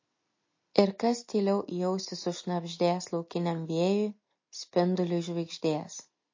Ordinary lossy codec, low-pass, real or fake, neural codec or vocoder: MP3, 32 kbps; 7.2 kHz; real; none